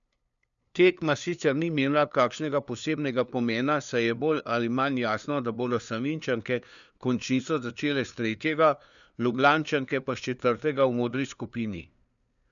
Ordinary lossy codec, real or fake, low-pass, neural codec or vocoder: none; fake; 7.2 kHz; codec, 16 kHz, 2 kbps, FunCodec, trained on LibriTTS, 25 frames a second